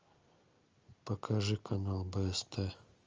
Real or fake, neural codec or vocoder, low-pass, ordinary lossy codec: real; none; 7.2 kHz; Opus, 32 kbps